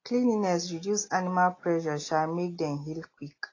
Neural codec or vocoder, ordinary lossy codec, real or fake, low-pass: none; AAC, 32 kbps; real; 7.2 kHz